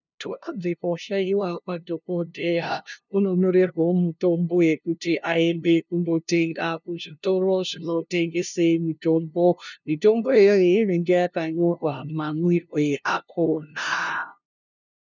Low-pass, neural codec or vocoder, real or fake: 7.2 kHz; codec, 16 kHz, 0.5 kbps, FunCodec, trained on LibriTTS, 25 frames a second; fake